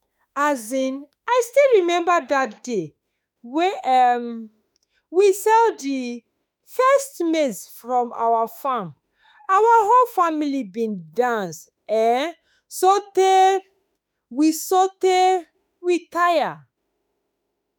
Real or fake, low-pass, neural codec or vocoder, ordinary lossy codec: fake; none; autoencoder, 48 kHz, 32 numbers a frame, DAC-VAE, trained on Japanese speech; none